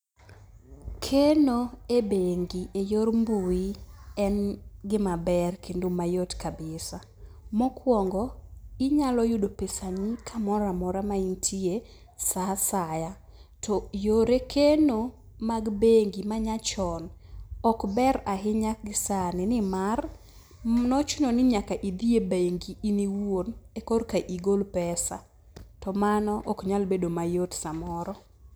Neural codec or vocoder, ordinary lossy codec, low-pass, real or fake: none; none; none; real